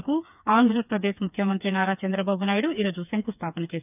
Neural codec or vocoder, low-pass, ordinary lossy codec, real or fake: codec, 16 kHz, 4 kbps, FreqCodec, smaller model; 3.6 kHz; none; fake